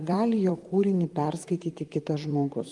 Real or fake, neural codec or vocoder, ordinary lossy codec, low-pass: fake; vocoder, 44.1 kHz, 128 mel bands, Pupu-Vocoder; Opus, 24 kbps; 10.8 kHz